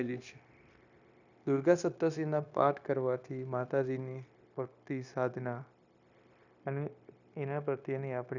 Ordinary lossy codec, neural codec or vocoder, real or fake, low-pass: none; codec, 16 kHz, 0.9 kbps, LongCat-Audio-Codec; fake; 7.2 kHz